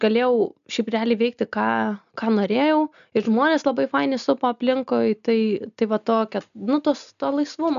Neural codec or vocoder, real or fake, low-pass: none; real; 7.2 kHz